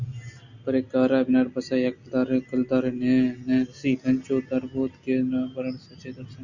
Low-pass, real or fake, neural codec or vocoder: 7.2 kHz; real; none